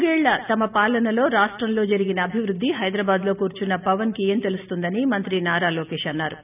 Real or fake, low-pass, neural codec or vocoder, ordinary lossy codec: real; 3.6 kHz; none; none